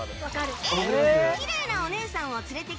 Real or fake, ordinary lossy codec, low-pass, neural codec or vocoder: real; none; none; none